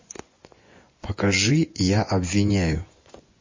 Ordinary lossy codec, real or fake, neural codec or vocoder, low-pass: MP3, 32 kbps; fake; vocoder, 24 kHz, 100 mel bands, Vocos; 7.2 kHz